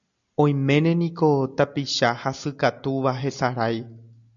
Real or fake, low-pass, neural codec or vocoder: real; 7.2 kHz; none